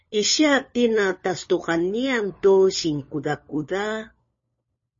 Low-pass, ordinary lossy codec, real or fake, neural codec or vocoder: 7.2 kHz; MP3, 32 kbps; fake; codec, 16 kHz, 4 kbps, FunCodec, trained on LibriTTS, 50 frames a second